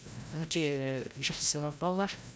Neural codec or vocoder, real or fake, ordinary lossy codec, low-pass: codec, 16 kHz, 0.5 kbps, FreqCodec, larger model; fake; none; none